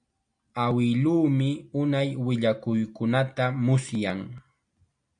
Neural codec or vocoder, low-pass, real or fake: none; 9.9 kHz; real